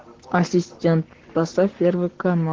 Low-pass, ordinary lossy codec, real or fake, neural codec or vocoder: 7.2 kHz; Opus, 16 kbps; fake; codec, 44.1 kHz, 7.8 kbps, Pupu-Codec